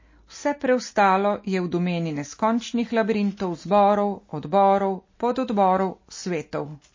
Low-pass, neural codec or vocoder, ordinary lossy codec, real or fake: 7.2 kHz; none; MP3, 32 kbps; real